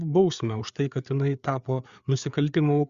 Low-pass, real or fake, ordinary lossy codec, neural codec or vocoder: 7.2 kHz; fake; Opus, 64 kbps; codec, 16 kHz, 4 kbps, FreqCodec, larger model